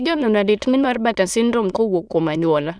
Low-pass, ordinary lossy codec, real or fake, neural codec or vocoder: none; none; fake; autoencoder, 22.05 kHz, a latent of 192 numbers a frame, VITS, trained on many speakers